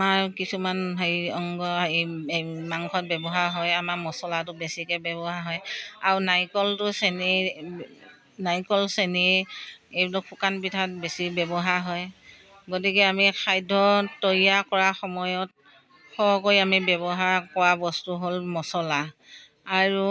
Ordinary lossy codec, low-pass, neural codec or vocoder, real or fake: none; none; none; real